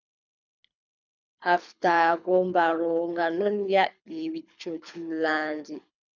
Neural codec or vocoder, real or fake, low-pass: codec, 24 kHz, 6 kbps, HILCodec; fake; 7.2 kHz